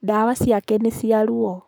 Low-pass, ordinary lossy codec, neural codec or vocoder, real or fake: none; none; codec, 44.1 kHz, 7.8 kbps, Pupu-Codec; fake